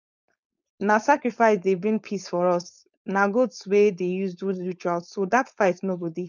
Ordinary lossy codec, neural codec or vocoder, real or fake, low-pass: none; codec, 16 kHz, 4.8 kbps, FACodec; fake; 7.2 kHz